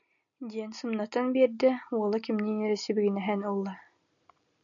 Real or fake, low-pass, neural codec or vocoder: real; 7.2 kHz; none